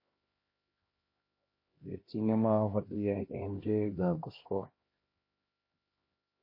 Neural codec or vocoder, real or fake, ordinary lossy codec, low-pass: codec, 16 kHz, 1 kbps, X-Codec, HuBERT features, trained on LibriSpeech; fake; MP3, 24 kbps; 5.4 kHz